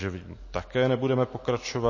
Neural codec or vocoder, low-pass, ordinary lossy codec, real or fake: none; 7.2 kHz; MP3, 32 kbps; real